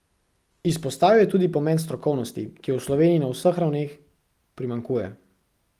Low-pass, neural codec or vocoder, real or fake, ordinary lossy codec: 14.4 kHz; none; real; Opus, 32 kbps